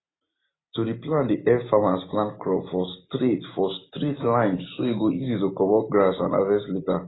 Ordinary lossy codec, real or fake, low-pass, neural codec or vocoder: AAC, 16 kbps; real; 7.2 kHz; none